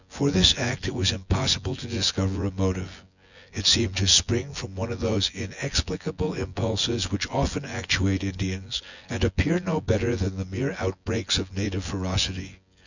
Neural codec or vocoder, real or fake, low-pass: vocoder, 24 kHz, 100 mel bands, Vocos; fake; 7.2 kHz